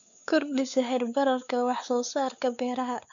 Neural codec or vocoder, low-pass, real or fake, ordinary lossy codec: codec, 16 kHz, 4 kbps, X-Codec, WavLM features, trained on Multilingual LibriSpeech; 7.2 kHz; fake; none